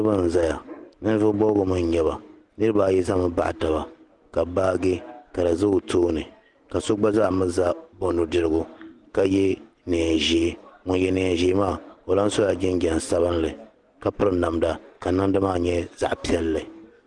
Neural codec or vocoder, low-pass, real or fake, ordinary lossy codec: none; 10.8 kHz; real; Opus, 24 kbps